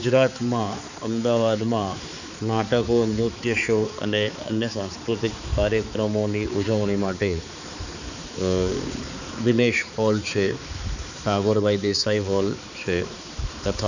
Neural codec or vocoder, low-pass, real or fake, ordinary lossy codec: codec, 16 kHz, 4 kbps, X-Codec, HuBERT features, trained on balanced general audio; 7.2 kHz; fake; none